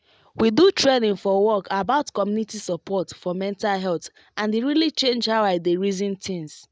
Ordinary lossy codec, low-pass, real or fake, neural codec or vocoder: none; none; real; none